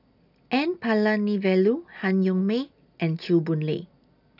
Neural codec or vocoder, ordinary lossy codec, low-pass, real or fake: none; none; 5.4 kHz; real